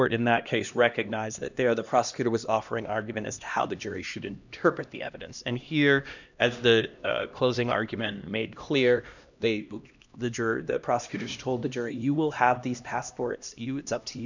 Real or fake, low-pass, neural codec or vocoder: fake; 7.2 kHz; codec, 16 kHz, 1 kbps, X-Codec, HuBERT features, trained on LibriSpeech